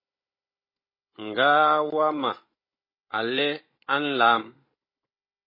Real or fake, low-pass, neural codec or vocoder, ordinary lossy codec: fake; 5.4 kHz; codec, 16 kHz, 16 kbps, FunCodec, trained on Chinese and English, 50 frames a second; MP3, 24 kbps